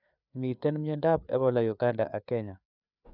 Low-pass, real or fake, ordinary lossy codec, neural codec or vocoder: 5.4 kHz; fake; none; codec, 16 kHz, 4 kbps, FreqCodec, larger model